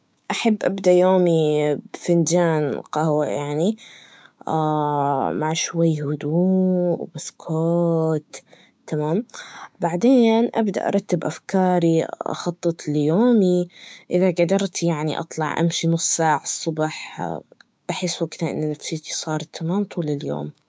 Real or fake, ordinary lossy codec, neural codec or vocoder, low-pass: fake; none; codec, 16 kHz, 6 kbps, DAC; none